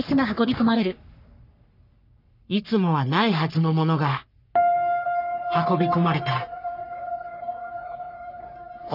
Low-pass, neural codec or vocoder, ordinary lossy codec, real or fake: 5.4 kHz; codec, 44.1 kHz, 3.4 kbps, Pupu-Codec; none; fake